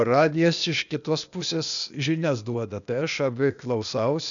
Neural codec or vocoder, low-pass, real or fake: codec, 16 kHz, 0.8 kbps, ZipCodec; 7.2 kHz; fake